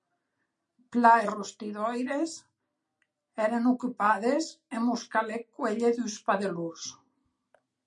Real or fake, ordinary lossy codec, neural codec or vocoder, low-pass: real; MP3, 48 kbps; none; 10.8 kHz